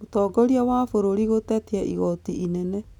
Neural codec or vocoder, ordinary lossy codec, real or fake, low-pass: none; none; real; 19.8 kHz